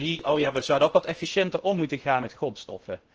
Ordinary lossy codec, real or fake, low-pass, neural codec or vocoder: Opus, 16 kbps; fake; 7.2 kHz; codec, 16 kHz in and 24 kHz out, 0.8 kbps, FocalCodec, streaming, 65536 codes